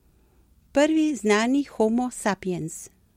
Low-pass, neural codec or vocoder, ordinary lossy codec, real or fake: 19.8 kHz; none; MP3, 64 kbps; real